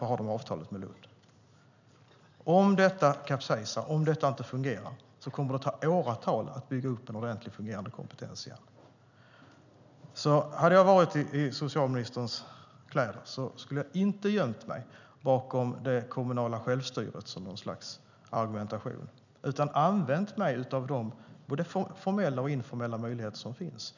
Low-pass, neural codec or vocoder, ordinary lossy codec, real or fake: 7.2 kHz; none; none; real